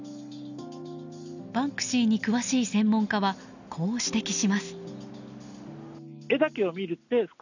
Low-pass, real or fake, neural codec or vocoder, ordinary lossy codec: 7.2 kHz; real; none; none